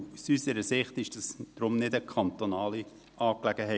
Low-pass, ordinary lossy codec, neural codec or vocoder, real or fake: none; none; none; real